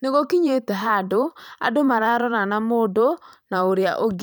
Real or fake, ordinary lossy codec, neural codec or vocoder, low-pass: fake; none; vocoder, 44.1 kHz, 128 mel bands every 512 samples, BigVGAN v2; none